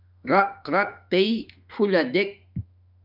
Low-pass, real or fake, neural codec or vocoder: 5.4 kHz; fake; autoencoder, 48 kHz, 32 numbers a frame, DAC-VAE, trained on Japanese speech